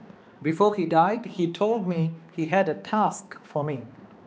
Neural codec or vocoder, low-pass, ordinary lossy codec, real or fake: codec, 16 kHz, 2 kbps, X-Codec, HuBERT features, trained on balanced general audio; none; none; fake